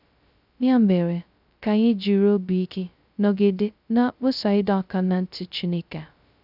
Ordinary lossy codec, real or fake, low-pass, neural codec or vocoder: none; fake; 5.4 kHz; codec, 16 kHz, 0.2 kbps, FocalCodec